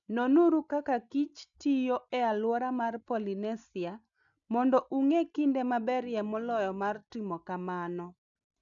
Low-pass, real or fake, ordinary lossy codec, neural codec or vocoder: 7.2 kHz; real; none; none